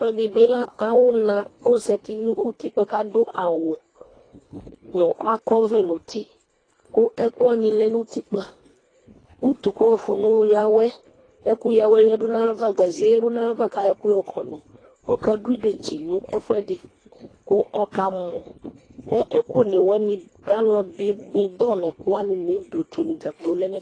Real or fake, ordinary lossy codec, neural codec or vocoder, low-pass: fake; AAC, 32 kbps; codec, 24 kHz, 1.5 kbps, HILCodec; 9.9 kHz